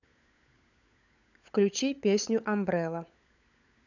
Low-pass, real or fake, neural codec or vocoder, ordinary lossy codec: 7.2 kHz; fake; codec, 16 kHz, 16 kbps, FunCodec, trained on LibriTTS, 50 frames a second; none